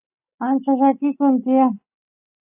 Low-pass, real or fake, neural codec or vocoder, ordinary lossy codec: 3.6 kHz; real; none; AAC, 24 kbps